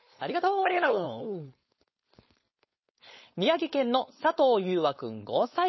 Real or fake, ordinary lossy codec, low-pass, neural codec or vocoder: fake; MP3, 24 kbps; 7.2 kHz; codec, 16 kHz, 4.8 kbps, FACodec